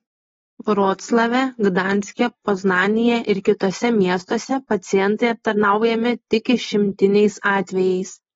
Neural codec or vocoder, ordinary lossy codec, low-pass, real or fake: none; AAC, 24 kbps; 7.2 kHz; real